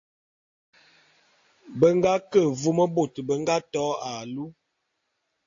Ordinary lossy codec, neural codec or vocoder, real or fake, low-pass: AAC, 48 kbps; none; real; 7.2 kHz